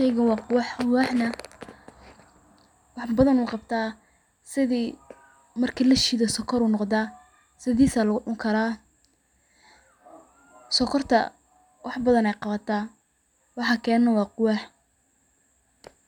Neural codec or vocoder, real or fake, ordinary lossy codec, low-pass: none; real; none; 19.8 kHz